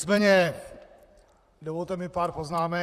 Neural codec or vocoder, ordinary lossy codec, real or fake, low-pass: vocoder, 44.1 kHz, 128 mel bands, Pupu-Vocoder; Opus, 64 kbps; fake; 14.4 kHz